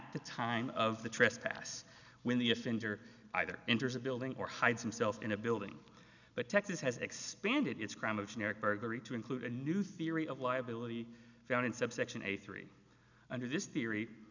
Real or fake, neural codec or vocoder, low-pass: real; none; 7.2 kHz